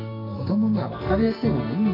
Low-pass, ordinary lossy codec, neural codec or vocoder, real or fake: 5.4 kHz; none; codec, 44.1 kHz, 2.6 kbps, SNAC; fake